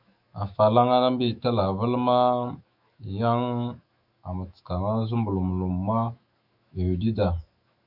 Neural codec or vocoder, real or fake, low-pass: autoencoder, 48 kHz, 128 numbers a frame, DAC-VAE, trained on Japanese speech; fake; 5.4 kHz